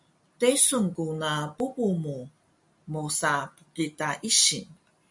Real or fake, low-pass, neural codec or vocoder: real; 10.8 kHz; none